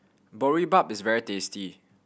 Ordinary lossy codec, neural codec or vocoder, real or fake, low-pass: none; none; real; none